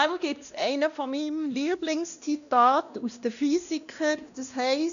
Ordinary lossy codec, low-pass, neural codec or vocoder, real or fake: none; 7.2 kHz; codec, 16 kHz, 1 kbps, X-Codec, WavLM features, trained on Multilingual LibriSpeech; fake